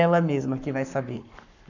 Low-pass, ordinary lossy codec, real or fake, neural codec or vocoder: 7.2 kHz; none; fake; codec, 44.1 kHz, 7.8 kbps, Pupu-Codec